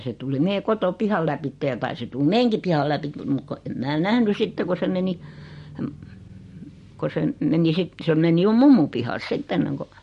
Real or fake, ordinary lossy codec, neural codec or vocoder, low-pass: real; MP3, 48 kbps; none; 14.4 kHz